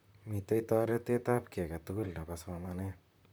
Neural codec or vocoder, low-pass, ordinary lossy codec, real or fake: vocoder, 44.1 kHz, 128 mel bands, Pupu-Vocoder; none; none; fake